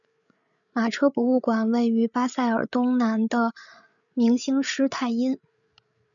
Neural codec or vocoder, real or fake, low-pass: codec, 16 kHz, 8 kbps, FreqCodec, larger model; fake; 7.2 kHz